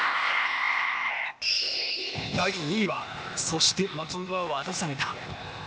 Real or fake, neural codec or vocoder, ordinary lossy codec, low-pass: fake; codec, 16 kHz, 0.8 kbps, ZipCodec; none; none